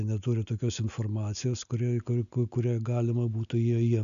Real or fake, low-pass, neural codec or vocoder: real; 7.2 kHz; none